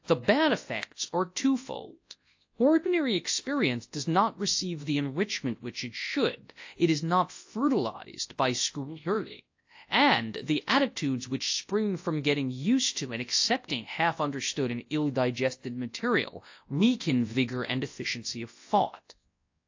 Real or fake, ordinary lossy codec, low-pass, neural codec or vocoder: fake; AAC, 48 kbps; 7.2 kHz; codec, 24 kHz, 0.9 kbps, WavTokenizer, large speech release